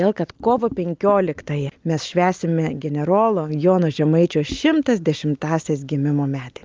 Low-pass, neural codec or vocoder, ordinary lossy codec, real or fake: 7.2 kHz; none; Opus, 24 kbps; real